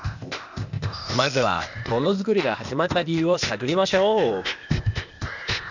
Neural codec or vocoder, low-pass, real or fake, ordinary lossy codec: codec, 16 kHz, 0.8 kbps, ZipCodec; 7.2 kHz; fake; none